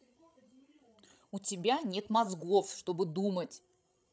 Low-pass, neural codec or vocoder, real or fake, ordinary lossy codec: none; codec, 16 kHz, 16 kbps, FreqCodec, larger model; fake; none